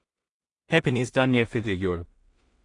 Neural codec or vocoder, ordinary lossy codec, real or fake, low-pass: codec, 16 kHz in and 24 kHz out, 0.4 kbps, LongCat-Audio-Codec, two codebook decoder; AAC, 48 kbps; fake; 10.8 kHz